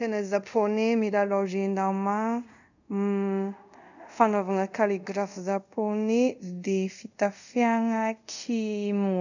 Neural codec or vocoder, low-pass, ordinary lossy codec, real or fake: codec, 24 kHz, 0.5 kbps, DualCodec; 7.2 kHz; none; fake